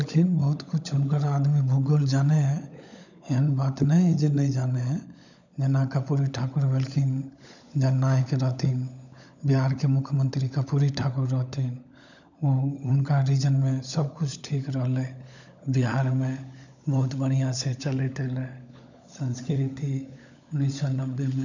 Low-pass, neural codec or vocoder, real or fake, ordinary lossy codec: 7.2 kHz; codec, 16 kHz, 16 kbps, FunCodec, trained on LibriTTS, 50 frames a second; fake; none